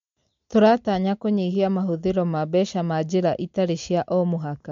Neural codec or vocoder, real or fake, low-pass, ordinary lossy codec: none; real; 7.2 kHz; MP3, 48 kbps